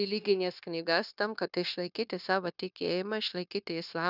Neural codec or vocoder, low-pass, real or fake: codec, 16 kHz, 0.9 kbps, LongCat-Audio-Codec; 5.4 kHz; fake